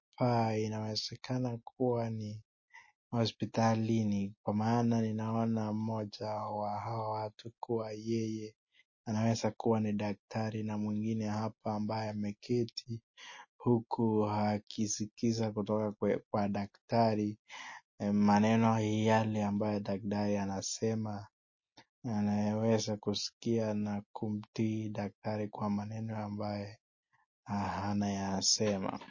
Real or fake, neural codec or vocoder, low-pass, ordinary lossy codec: real; none; 7.2 kHz; MP3, 32 kbps